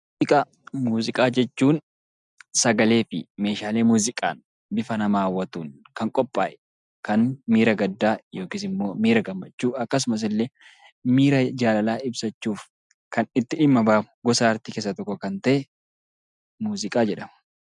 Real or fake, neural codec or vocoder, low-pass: real; none; 10.8 kHz